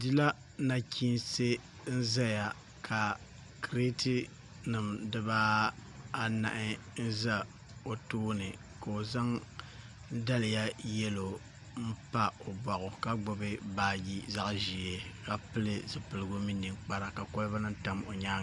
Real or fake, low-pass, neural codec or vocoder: real; 10.8 kHz; none